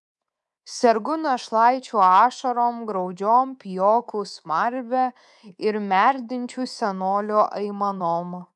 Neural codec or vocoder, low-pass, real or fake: codec, 24 kHz, 3.1 kbps, DualCodec; 10.8 kHz; fake